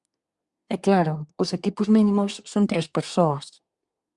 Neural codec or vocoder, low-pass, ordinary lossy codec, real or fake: codec, 24 kHz, 1 kbps, SNAC; 10.8 kHz; Opus, 64 kbps; fake